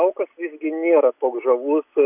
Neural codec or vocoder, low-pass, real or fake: none; 3.6 kHz; real